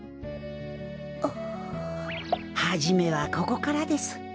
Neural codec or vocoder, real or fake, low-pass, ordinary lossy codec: none; real; none; none